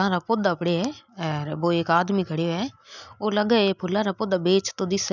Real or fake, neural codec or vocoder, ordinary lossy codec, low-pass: real; none; none; 7.2 kHz